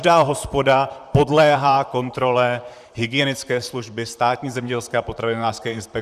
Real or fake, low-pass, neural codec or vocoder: fake; 14.4 kHz; vocoder, 44.1 kHz, 128 mel bands, Pupu-Vocoder